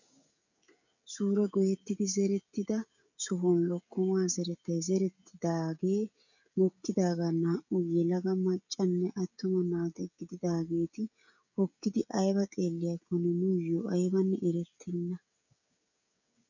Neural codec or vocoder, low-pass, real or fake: codec, 16 kHz, 16 kbps, FreqCodec, smaller model; 7.2 kHz; fake